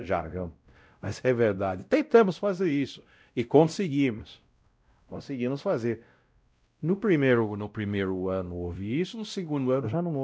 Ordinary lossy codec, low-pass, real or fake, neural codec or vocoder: none; none; fake; codec, 16 kHz, 0.5 kbps, X-Codec, WavLM features, trained on Multilingual LibriSpeech